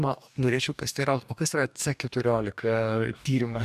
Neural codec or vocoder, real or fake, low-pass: codec, 44.1 kHz, 2.6 kbps, DAC; fake; 14.4 kHz